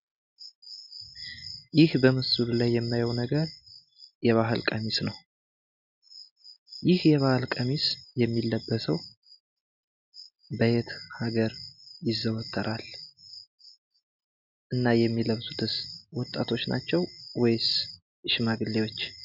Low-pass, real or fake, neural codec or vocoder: 5.4 kHz; real; none